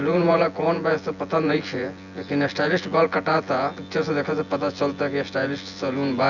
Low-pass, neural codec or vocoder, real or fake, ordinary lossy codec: 7.2 kHz; vocoder, 24 kHz, 100 mel bands, Vocos; fake; none